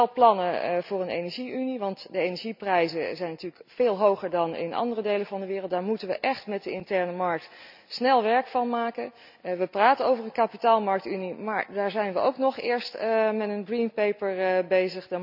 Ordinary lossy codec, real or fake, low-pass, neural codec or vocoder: none; real; 5.4 kHz; none